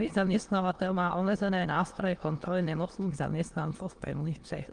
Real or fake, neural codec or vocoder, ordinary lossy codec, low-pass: fake; autoencoder, 22.05 kHz, a latent of 192 numbers a frame, VITS, trained on many speakers; Opus, 24 kbps; 9.9 kHz